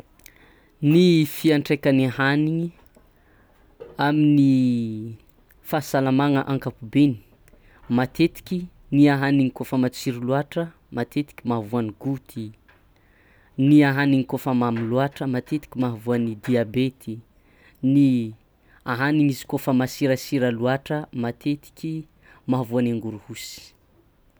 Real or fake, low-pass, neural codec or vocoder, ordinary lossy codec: real; none; none; none